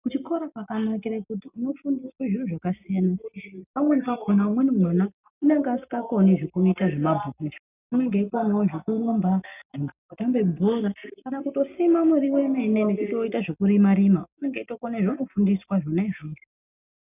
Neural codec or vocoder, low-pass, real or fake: none; 3.6 kHz; real